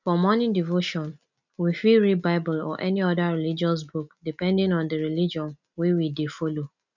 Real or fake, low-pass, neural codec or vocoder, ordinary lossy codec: real; 7.2 kHz; none; none